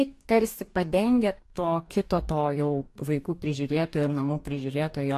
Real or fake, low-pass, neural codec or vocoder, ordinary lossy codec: fake; 14.4 kHz; codec, 44.1 kHz, 2.6 kbps, DAC; AAC, 64 kbps